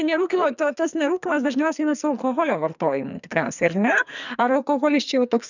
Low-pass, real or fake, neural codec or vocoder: 7.2 kHz; fake; codec, 44.1 kHz, 2.6 kbps, SNAC